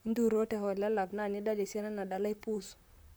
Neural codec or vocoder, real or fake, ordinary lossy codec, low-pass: vocoder, 44.1 kHz, 128 mel bands, Pupu-Vocoder; fake; none; none